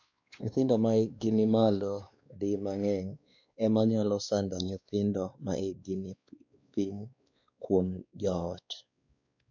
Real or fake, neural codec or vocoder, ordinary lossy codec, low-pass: fake; codec, 16 kHz, 2 kbps, X-Codec, WavLM features, trained on Multilingual LibriSpeech; none; 7.2 kHz